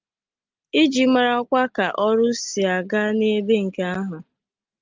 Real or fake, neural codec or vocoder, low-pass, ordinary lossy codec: real; none; 7.2 kHz; Opus, 24 kbps